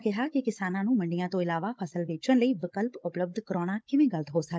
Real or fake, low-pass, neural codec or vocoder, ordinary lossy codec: fake; none; codec, 16 kHz, 16 kbps, FunCodec, trained on Chinese and English, 50 frames a second; none